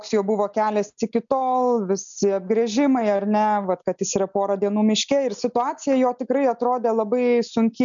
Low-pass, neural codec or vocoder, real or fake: 7.2 kHz; none; real